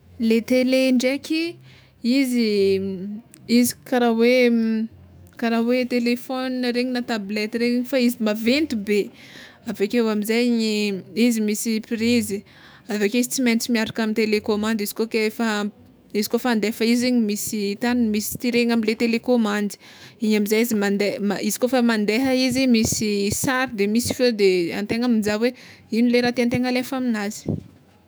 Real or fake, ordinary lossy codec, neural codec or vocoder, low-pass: fake; none; autoencoder, 48 kHz, 128 numbers a frame, DAC-VAE, trained on Japanese speech; none